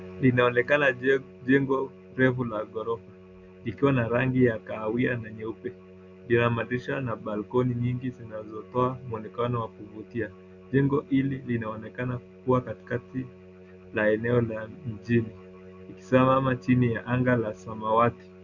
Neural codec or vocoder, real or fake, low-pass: none; real; 7.2 kHz